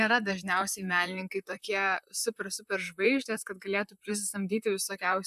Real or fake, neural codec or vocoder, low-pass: fake; vocoder, 44.1 kHz, 128 mel bands, Pupu-Vocoder; 14.4 kHz